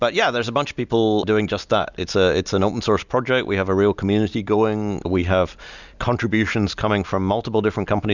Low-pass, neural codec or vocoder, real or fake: 7.2 kHz; none; real